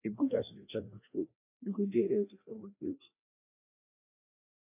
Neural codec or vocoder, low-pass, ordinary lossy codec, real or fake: codec, 16 kHz, 1 kbps, FreqCodec, larger model; 3.6 kHz; AAC, 32 kbps; fake